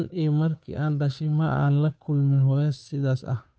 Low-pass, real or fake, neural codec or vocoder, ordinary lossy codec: none; fake; codec, 16 kHz, 2 kbps, FunCodec, trained on Chinese and English, 25 frames a second; none